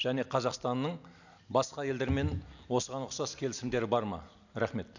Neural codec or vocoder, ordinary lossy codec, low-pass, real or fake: none; none; 7.2 kHz; real